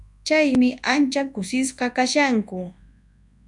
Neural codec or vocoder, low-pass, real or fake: codec, 24 kHz, 0.9 kbps, WavTokenizer, large speech release; 10.8 kHz; fake